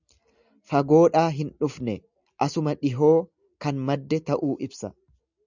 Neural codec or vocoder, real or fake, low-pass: none; real; 7.2 kHz